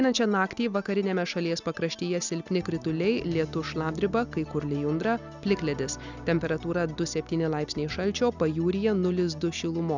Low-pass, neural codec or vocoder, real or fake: 7.2 kHz; none; real